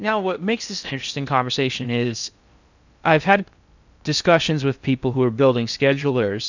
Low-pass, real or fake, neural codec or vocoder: 7.2 kHz; fake; codec, 16 kHz in and 24 kHz out, 0.6 kbps, FocalCodec, streaming, 2048 codes